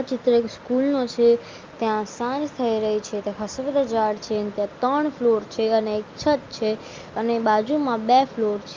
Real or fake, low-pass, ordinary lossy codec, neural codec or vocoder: real; 7.2 kHz; Opus, 32 kbps; none